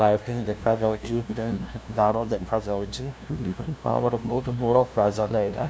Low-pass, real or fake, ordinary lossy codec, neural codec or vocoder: none; fake; none; codec, 16 kHz, 0.5 kbps, FunCodec, trained on LibriTTS, 25 frames a second